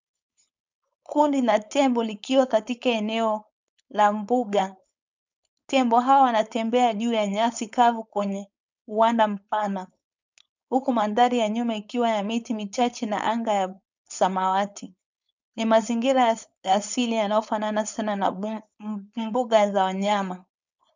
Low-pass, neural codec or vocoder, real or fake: 7.2 kHz; codec, 16 kHz, 4.8 kbps, FACodec; fake